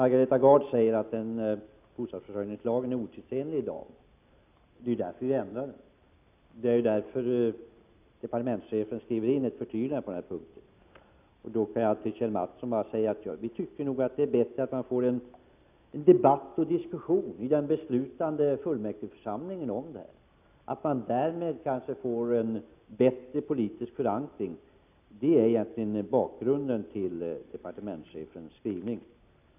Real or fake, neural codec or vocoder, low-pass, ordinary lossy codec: real; none; 3.6 kHz; none